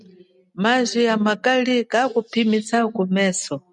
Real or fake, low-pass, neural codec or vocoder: real; 10.8 kHz; none